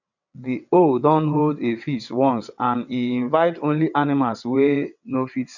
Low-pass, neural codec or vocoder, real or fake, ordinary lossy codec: 7.2 kHz; vocoder, 22.05 kHz, 80 mel bands, WaveNeXt; fake; none